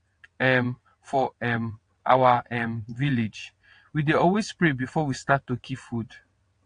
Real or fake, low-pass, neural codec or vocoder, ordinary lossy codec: fake; 9.9 kHz; vocoder, 22.05 kHz, 80 mel bands, WaveNeXt; AAC, 48 kbps